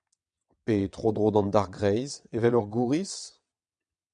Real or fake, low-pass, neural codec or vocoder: fake; 9.9 kHz; vocoder, 22.05 kHz, 80 mel bands, WaveNeXt